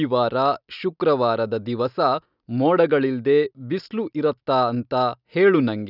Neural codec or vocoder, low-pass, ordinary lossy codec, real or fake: none; 5.4 kHz; none; real